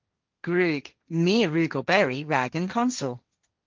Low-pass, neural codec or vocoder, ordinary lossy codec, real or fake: 7.2 kHz; codec, 16 kHz, 1.1 kbps, Voila-Tokenizer; Opus, 24 kbps; fake